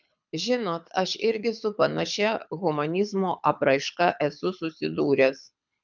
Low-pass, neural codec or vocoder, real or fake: 7.2 kHz; codec, 24 kHz, 6 kbps, HILCodec; fake